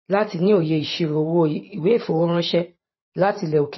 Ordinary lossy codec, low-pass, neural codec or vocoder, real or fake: MP3, 24 kbps; 7.2 kHz; vocoder, 44.1 kHz, 128 mel bands every 512 samples, BigVGAN v2; fake